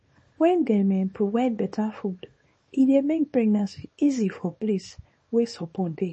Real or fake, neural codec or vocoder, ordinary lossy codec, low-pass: fake; codec, 24 kHz, 0.9 kbps, WavTokenizer, small release; MP3, 32 kbps; 10.8 kHz